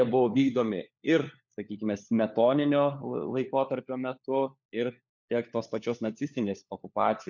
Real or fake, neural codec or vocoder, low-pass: fake; codec, 16 kHz, 4 kbps, FunCodec, trained on LibriTTS, 50 frames a second; 7.2 kHz